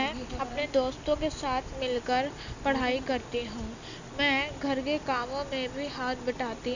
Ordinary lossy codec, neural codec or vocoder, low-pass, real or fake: none; none; 7.2 kHz; real